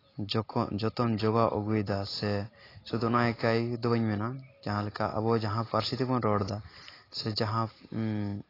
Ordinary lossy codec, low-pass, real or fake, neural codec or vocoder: AAC, 24 kbps; 5.4 kHz; real; none